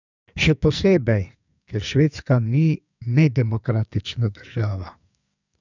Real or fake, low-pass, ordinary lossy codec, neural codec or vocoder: fake; 7.2 kHz; none; codec, 44.1 kHz, 2.6 kbps, SNAC